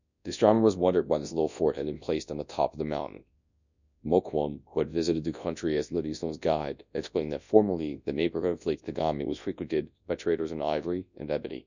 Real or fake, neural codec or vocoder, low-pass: fake; codec, 24 kHz, 0.9 kbps, WavTokenizer, large speech release; 7.2 kHz